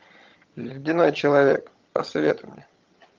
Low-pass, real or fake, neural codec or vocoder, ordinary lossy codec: 7.2 kHz; fake; vocoder, 22.05 kHz, 80 mel bands, HiFi-GAN; Opus, 24 kbps